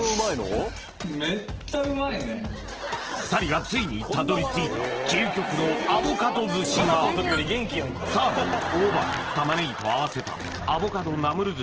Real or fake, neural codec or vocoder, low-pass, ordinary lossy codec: real; none; 7.2 kHz; Opus, 16 kbps